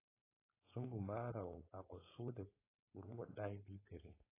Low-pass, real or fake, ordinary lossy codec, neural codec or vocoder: 3.6 kHz; fake; AAC, 16 kbps; codec, 16 kHz, 4.8 kbps, FACodec